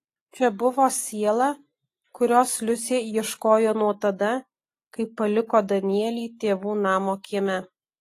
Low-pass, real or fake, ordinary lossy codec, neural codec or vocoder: 14.4 kHz; real; AAC, 48 kbps; none